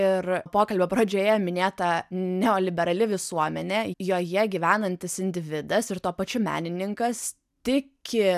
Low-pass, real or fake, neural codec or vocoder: 14.4 kHz; real; none